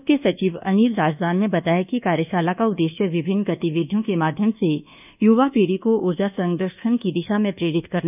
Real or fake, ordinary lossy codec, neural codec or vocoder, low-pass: fake; none; codec, 24 kHz, 1.2 kbps, DualCodec; 3.6 kHz